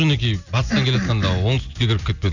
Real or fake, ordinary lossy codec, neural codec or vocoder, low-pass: real; none; none; 7.2 kHz